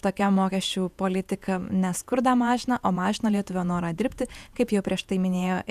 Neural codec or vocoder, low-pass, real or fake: vocoder, 48 kHz, 128 mel bands, Vocos; 14.4 kHz; fake